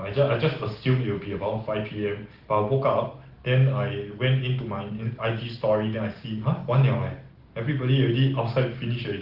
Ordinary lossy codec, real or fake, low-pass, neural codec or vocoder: Opus, 16 kbps; real; 5.4 kHz; none